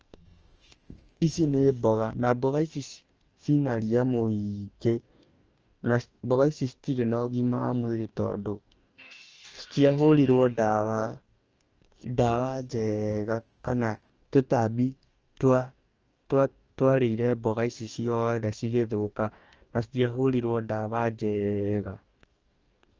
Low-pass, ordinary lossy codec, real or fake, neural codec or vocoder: 7.2 kHz; Opus, 24 kbps; fake; codec, 44.1 kHz, 2.6 kbps, DAC